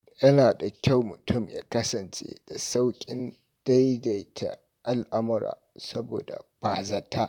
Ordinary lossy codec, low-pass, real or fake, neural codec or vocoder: none; 19.8 kHz; fake; vocoder, 44.1 kHz, 128 mel bands, Pupu-Vocoder